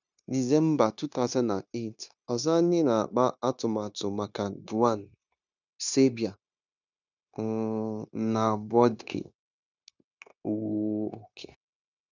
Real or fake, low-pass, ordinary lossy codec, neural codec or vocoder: fake; 7.2 kHz; none; codec, 16 kHz, 0.9 kbps, LongCat-Audio-Codec